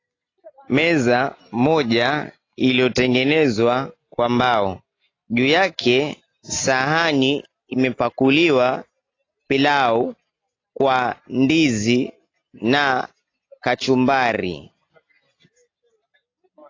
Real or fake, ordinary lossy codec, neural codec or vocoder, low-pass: real; AAC, 32 kbps; none; 7.2 kHz